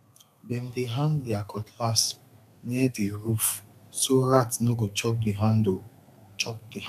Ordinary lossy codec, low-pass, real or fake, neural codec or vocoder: none; 14.4 kHz; fake; codec, 32 kHz, 1.9 kbps, SNAC